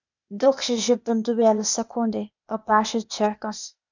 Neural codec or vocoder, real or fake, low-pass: codec, 16 kHz, 0.8 kbps, ZipCodec; fake; 7.2 kHz